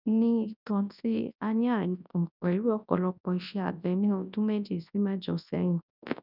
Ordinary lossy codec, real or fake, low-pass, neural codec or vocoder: none; fake; 5.4 kHz; codec, 24 kHz, 0.9 kbps, WavTokenizer, large speech release